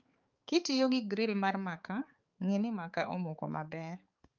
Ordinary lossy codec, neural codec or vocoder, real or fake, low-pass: Opus, 24 kbps; codec, 16 kHz, 4 kbps, X-Codec, HuBERT features, trained on balanced general audio; fake; 7.2 kHz